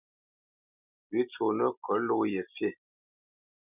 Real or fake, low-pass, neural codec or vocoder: real; 3.6 kHz; none